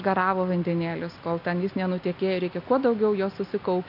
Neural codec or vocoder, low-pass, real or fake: none; 5.4 kHz; real